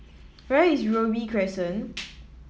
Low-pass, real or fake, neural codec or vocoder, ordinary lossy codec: none; real; none; none